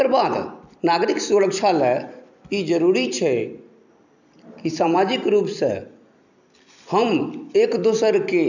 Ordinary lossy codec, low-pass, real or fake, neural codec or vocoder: none; 7.2 kHz; fake; vocoder, 44.1 kHz, 128 mel bands every 256 samples, BigVGAN v2